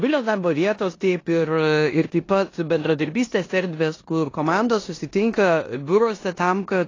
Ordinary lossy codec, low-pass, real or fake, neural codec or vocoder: AAC, 32 kbps; 7.2 kHz; fake; codec, 16 kHz in and 24 kHz out, 0.9 kbps, LongCat-Audio-Codec, four codebook decoder